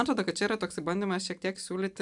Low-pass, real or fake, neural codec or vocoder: 10.8 kHz; real; none